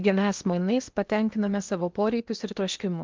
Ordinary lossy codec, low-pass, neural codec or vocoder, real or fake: Opus, 24 kbps; 7.2 kHz; codec, 16 kHz in and 24 kHz out, 0.8 kbps, FocalCodec, streaming, 65536 codes; fake